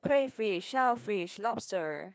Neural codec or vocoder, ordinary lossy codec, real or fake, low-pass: codec, 16 kHz, 2 kbps, FreqCodec, larger model; none; fake; none